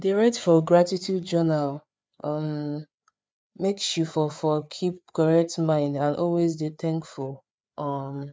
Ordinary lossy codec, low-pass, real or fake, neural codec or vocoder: none; none; fake; codec, 16 kHz, 4 kbps, FreqCodec, larger model